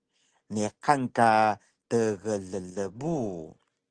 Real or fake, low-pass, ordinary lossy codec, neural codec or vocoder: real; 9.9 kHz; Opus, 16 kbps; none